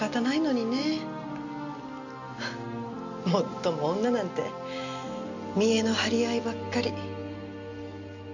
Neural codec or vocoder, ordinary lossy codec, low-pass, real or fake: none; none; 7.2 kHz; real